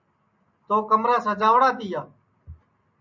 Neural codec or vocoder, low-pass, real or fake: none; 7.2 kHz; real